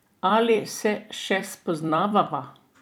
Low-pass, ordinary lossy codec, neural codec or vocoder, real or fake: 19.8 kHz; none; vocoder, 44.1 kHz, 128 mel bands every 256 samples, BigVGAN v2; fake